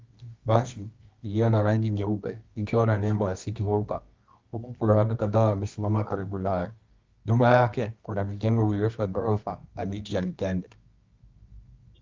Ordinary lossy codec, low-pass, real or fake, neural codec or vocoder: Opus, 32 kbps; 7.2 kHz; fake; codec, 24 kHz, 0.9 kbps, WavTokenizer, medium music audio release